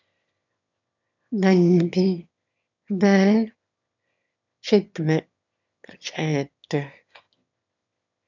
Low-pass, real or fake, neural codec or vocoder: 7.2 kHz; fake; autoencoder, 22.05 kHz, a latent of 192 numbers a frame, VITS, trained on one speaker